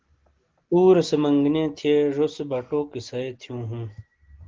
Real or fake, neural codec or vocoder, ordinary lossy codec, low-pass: real; none; Opus, 16 kbps; 7.2 kHz